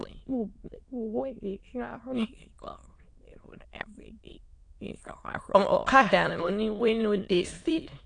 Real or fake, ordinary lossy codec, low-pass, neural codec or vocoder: fake; AAC, 48 kbps; 9.9 kHz; autoencoder, 22.05 kHz, a latent of 192 numbers a frame, VITS, trained on many speakers